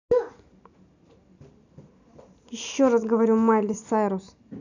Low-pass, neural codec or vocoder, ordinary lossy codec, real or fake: 7.2 kHz; none; none; real